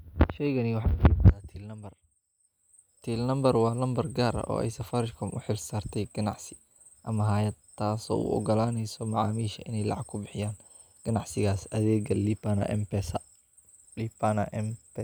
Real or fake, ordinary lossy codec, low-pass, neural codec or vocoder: real; none; none; none